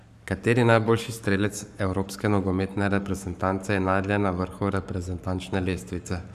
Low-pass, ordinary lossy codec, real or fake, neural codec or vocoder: 14.4 kHz; none; fake; codec, 44.1 kHz, 7.8 kbps, Pupu-Codec